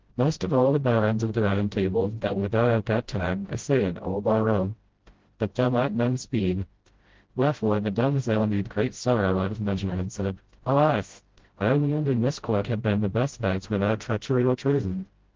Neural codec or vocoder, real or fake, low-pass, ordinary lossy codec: codec, 16 kHz, 0.5 kbps, FreqCodec, smaller model; fake; 7.2 kHz; Opus, 16 kbps